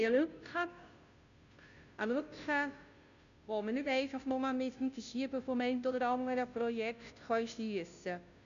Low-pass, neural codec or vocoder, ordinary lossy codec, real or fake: 7.2 kHz; codec, 16 kHz, 0.5 kbps, FunCodec, trained on Chinese and English, 25 frames a second; none; fake